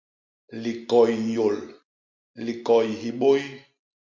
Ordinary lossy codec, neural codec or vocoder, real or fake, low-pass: MP3, 48 kbps; none; real; 7.2 kHz